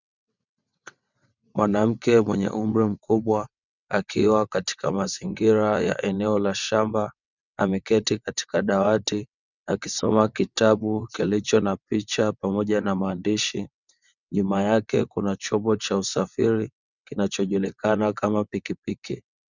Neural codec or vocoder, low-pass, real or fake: vocoder, 22.05 kHz, 80 mel bands, WaveNeXt; 7.2 kHz; fake